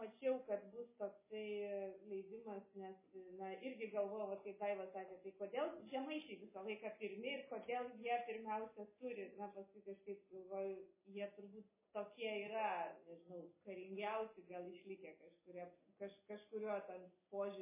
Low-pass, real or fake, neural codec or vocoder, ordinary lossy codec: 3.6 kHz; real; none; MP3, 16 kbps